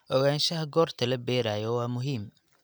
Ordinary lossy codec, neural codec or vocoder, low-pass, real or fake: none; none; none; real